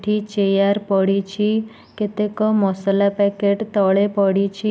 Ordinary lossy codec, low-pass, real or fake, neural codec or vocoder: none; none; real; none